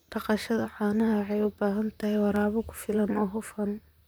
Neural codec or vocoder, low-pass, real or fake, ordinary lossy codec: vocoder, 44.1 kHz, 128 mel bands, Pupu-Vocoder; none; fake; none